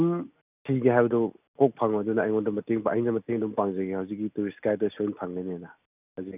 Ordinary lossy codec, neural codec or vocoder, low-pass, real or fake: none; none; 3.6 kHz; real